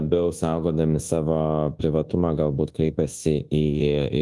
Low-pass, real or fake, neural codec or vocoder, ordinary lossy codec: 10.8 kHz; fake; codec, 24 kHz, 1.2 kbps, DualCodec; Opus, 24 kbps